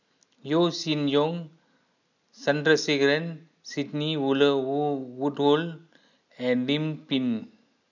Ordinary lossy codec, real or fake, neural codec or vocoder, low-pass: none; real; none; 7.2 kHz